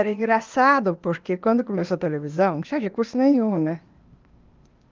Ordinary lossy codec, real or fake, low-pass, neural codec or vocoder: Opus, 32 kbps; fake; 7.2 kHz; codec, 16 kHz, 0.8 kbps, ZipCodec